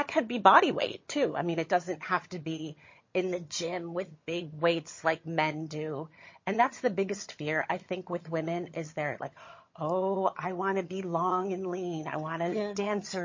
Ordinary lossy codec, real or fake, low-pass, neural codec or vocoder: MP3, 32 kbps; fake; 7.2 kHz; vocoder, 22.05 kHz, 80 mel bands, HiFi-GAN